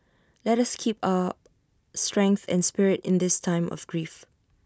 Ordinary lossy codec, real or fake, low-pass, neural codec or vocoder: none; real; none; none